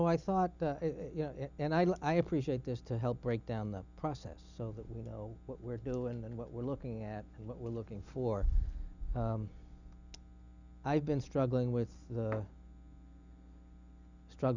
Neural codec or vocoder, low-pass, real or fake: none; 7.2 kHz; real